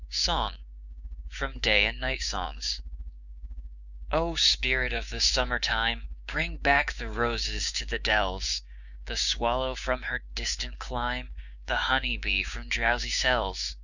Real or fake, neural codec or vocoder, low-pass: fake; codec, 16 kHz, 6 kbps, DAC; 7.2 kHz